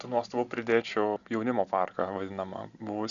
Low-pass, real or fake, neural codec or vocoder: 7.2 kHz; real; none